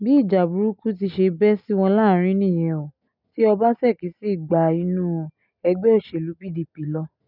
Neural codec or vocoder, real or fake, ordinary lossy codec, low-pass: none; real; none; 5.4 kHz